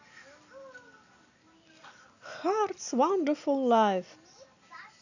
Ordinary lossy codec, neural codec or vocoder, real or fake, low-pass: none; none; real; 7.2 kHz